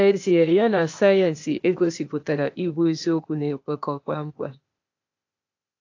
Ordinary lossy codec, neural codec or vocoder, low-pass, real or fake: AAC, 48 kbps; codec, 16 kHz, 0.8 kbps, ZipCodec; 7.2 kHz; fake